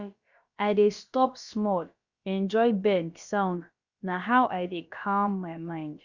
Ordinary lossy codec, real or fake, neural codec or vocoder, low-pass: Opus, 64 kbps; fake; codec, 16 kHz, about 1 kbps, DyCAST, with the encoder's durations; 7.2 kHz